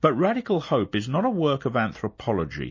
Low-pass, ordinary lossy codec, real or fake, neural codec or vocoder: 7.2 kHz; MP3, 32 kbps; real; none